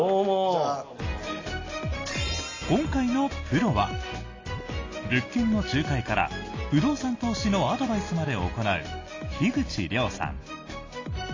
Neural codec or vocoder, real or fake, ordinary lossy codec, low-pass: none; real; AAC, 32 kbps; 7.2 kHz